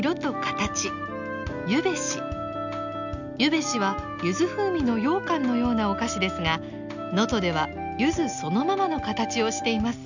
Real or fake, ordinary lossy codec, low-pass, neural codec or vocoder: real; none; 7.2 kHz; none